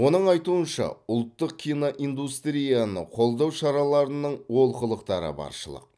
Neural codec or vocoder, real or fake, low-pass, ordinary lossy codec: none; real; none; none